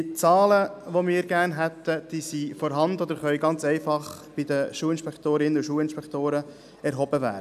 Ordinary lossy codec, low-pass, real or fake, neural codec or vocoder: none; 14.4 kHz; real; none